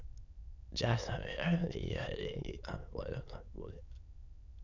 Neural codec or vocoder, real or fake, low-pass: autoencoder, 22.05 kHz, a latent of 192 numbers a frame, VITS, trained on many speakers; fake; 7.2 kHz